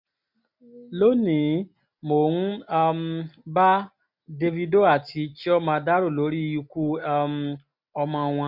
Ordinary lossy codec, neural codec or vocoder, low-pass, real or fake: MP3, 48 kbps; none; 5.4 kHz; real